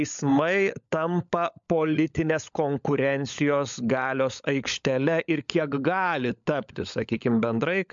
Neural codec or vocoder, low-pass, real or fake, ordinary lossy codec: codec, 16 kHz, 16 kbps, FunCodec, trained on LibriTTS, 50 frames a second; 7.2 kHz; fake; MP3, 64 kbps